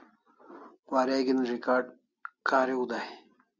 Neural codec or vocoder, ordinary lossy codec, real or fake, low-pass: none; Opus, 64 kbps; real; 7.2 kHz